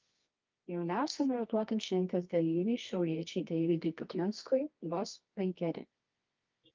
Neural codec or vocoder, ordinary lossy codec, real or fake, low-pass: codec, 24 kHz, 0.9 kbps, WavTokenizer, medium music audio release; Opus, 24 kbps; fake; 7.2 kHz